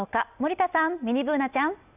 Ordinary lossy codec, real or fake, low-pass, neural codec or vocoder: none; real; 3.6 kHz; none